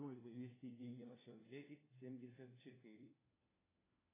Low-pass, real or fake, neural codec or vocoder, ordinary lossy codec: 3.6 kHz; fake; codec, 16 kHz, 1 kbps, FunCodec, trained on LibriTTS, 50 frames a second; AAC, 16 kbps